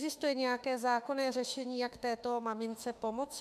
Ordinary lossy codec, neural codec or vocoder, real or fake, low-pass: MP3, 96 kbps; autoencoder, 48 kHz, 32 numbers a frame, DAC-VAE, trained on Japanese speech; fake; 14.4 kHz